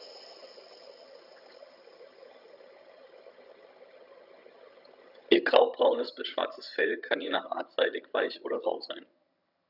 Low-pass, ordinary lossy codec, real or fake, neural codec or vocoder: 5.4 kHz; none; fake; vocoder, 22.05 kHz, 80 mel bands, HiFi-GAN